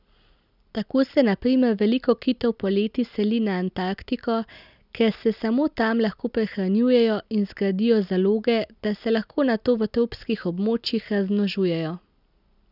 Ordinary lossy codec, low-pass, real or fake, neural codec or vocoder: none; 5.4 kHz; real; none